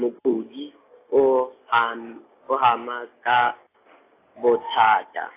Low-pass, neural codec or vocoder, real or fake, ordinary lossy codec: 3.6 kHz; none; real; AAC, 24 kbps